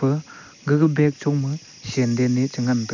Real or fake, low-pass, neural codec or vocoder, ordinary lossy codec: real; 7.2 kHz; none; none